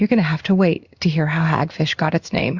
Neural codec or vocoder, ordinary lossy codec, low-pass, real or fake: codec, 16 kHz in and 24 kHz out, 1 kbps, XY-Tokenizer; Opus, 64 kbps; 7.2 kHz; fake